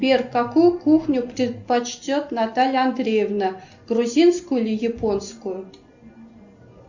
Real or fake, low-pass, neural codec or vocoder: real; 7.2 kHz; none